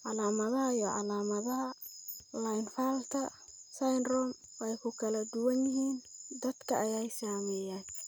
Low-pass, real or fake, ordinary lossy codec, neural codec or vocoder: none; real; none; none